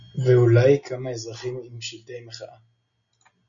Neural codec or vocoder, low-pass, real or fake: none; 7.2 kHz; real